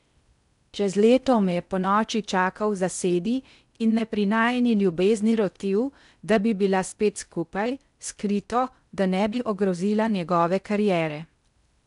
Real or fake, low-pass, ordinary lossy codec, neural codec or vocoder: fake; 10.8 kHz; none; codec, 16 kHz in and 24 kHz out, 0.6 kbps, FocalCodec, streaming, 4096 codes